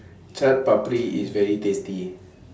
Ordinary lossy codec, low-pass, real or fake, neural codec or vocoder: none; none; real; none